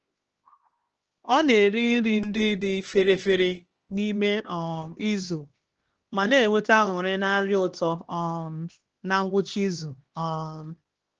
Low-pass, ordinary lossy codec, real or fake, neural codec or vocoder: 7.2 kHz; Opus, 16 kbps; fake; codec, 16 kHz, 2 kbps, X-Codec, HuBERT features, trained on LibriSpeech